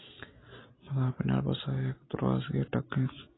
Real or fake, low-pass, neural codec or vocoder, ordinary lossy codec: real; 7.2 kHz; none; AAC, 16 kbps